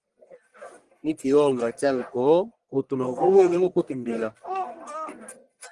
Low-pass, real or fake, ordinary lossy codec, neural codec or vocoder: 10.8 kHz; fake; Opus, 24 kbps; codec, 44.1 kHz, 1.7 kbps, Pupu-Codec